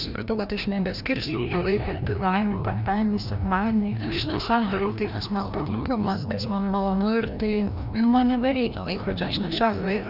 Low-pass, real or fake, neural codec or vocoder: 5.4 kHz; fake; codec, 16 kHz, 1 kbps, FreqCodec, larger model